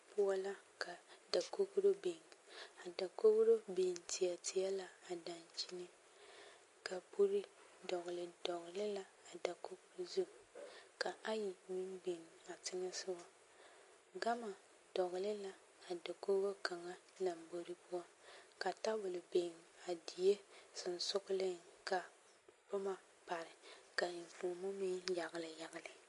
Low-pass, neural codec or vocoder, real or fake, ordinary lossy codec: 14.4 kHz; none; real; MP3, 48 kbps